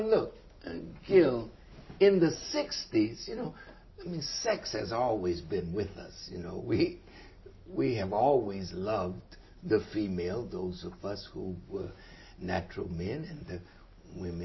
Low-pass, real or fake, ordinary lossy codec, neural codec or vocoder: 7.2 kHz; real; MP3, 24 kbps; none